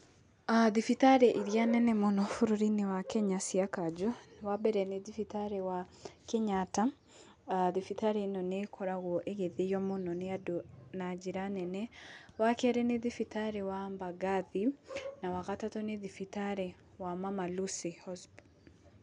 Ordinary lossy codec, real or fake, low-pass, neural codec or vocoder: none; real; 9.9 kHz; none